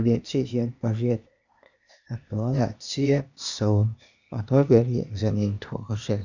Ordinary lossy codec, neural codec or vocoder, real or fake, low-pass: none; codec, 16 kHz, 0.8 kbps, ZipCodec; fake; 7.2 kHz